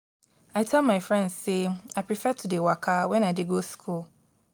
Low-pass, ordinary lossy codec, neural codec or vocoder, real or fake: none; none; none; real